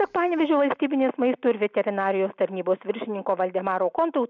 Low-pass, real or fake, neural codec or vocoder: 7.2 kHz; real; none